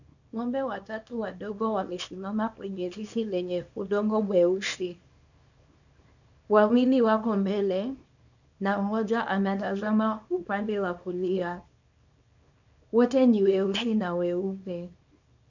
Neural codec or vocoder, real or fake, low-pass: codec, 24 kHz, 0.9 kbps, WavTokenizer, small release; fake; 7.2 kHz